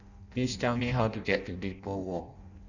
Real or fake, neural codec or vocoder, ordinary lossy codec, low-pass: fake; codec, 16 kHz in and 24 kHz out, 0.6 kbps, FireRedTTS-2 codec; none; 7.2 kHz